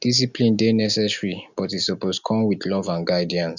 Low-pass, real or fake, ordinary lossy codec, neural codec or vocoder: 7.2 kHz; real; none; none